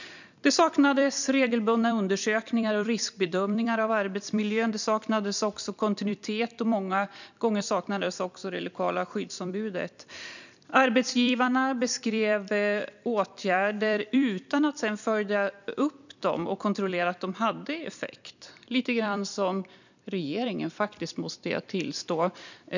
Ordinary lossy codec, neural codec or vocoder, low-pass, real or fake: none; vocoder, 44.1 kHz, 128 mel bands every 256 samples, BigVGAN v2; 7.2 kHz; fake